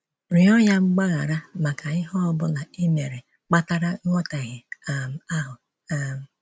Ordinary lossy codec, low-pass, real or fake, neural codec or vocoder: none; none; real; none